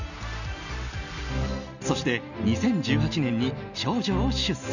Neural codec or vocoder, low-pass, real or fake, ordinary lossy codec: none; 7.2 kHz; real; none